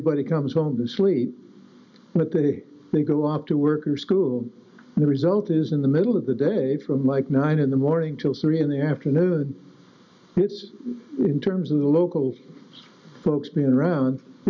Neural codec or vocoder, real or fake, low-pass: none; real; 7.2 kHz